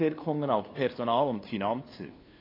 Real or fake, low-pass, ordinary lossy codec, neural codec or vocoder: fake; 5.4 kHz; AAC, 24 kbps; codec, 16 kHz, 2 kbps, FunCodec, trained on LibriTTS, 25 frames a second